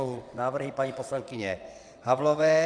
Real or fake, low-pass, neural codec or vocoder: fake; 9.9 kHz; vocoder, 22.05 kHz, 80 mel bands, WaveNeXt